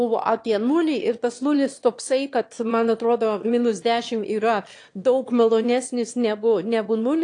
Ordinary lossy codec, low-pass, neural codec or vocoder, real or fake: AAC, 48 kbps; 9.9 kHz; autoencoder, 22.05 kHz, a latent of 192 numbers a frame, VITS, trained on one speaker; fake